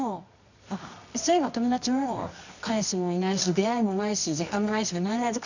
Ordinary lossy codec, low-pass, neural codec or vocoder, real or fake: none; 7.2 kHz; codec, 24 kHz, 0.9 kbps, WavTokenizer, medium music audio release; fake